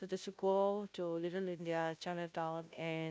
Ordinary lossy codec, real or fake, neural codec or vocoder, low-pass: none; fake; codec, 16 kHz, 0.5 kbps, FunCodec, trained on Chinese and English, 25 frames a second; none